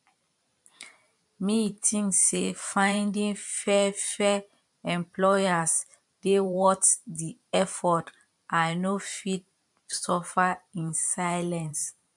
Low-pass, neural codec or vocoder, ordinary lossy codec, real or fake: 10.8 kHz; vocoder, 44.1 kHz, 128 mel bands every 512 samples, BigVGAN v2; MP3, 64 kbps; fake